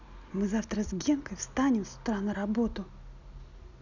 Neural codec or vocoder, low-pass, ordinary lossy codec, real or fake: none; 7.2 kHz; none; real